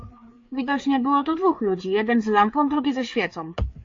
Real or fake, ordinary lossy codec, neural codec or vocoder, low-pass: fake; AAC, 48 kbps; codec, 16 kHz, 4 kbps, FreqCodec, larger model; 7.2 kHz